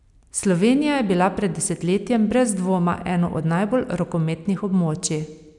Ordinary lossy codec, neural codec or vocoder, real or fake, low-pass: none; none; real; 10.8 kHz